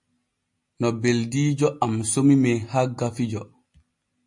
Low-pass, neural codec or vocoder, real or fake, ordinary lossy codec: 10.8 kHz; none; real; MP3, 48 kbps